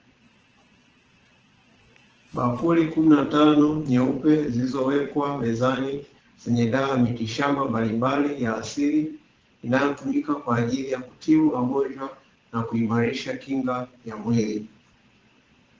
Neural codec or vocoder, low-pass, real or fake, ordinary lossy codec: vocoder, 22.05 kHz, 80 mel bands, Vocos; 7.2 kHz; fake; Opus, 16 kbps